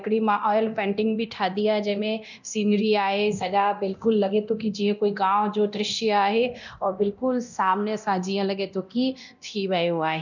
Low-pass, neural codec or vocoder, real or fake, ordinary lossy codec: 7.2 kHz; codec, 24 kHz, 0.9 kbps, DualCodec; fake; none